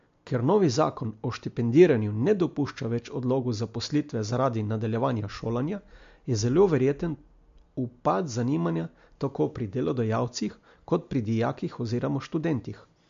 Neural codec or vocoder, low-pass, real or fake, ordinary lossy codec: none; 7.2 kHz; real; MP3, 48 kbps